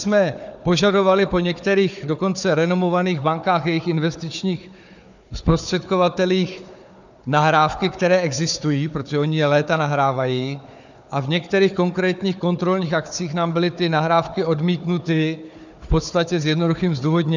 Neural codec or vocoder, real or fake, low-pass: codec, 16 kHz, 4 kbps, FunCodec, trained on Chinese and English, 50 frames a second; fake; 7.2 kHz